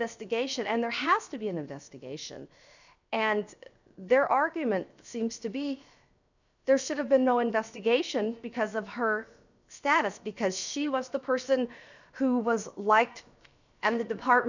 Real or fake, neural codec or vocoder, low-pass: fake; codec, 16 kHz, 0.7 kbps, FocalCodec; 7.2 kHz